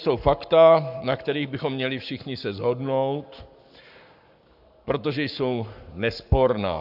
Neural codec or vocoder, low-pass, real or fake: codec, 44.1 kHz, 7.8 kbps, Pupu-Codec; 5.4 kHz; fake